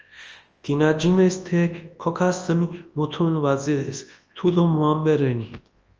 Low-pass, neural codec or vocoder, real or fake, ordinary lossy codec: 7.2 kHz; codec, 24 kHz, 0.9 kbps, WavTokenizer, large speech release; fake; Opus, 24 kbps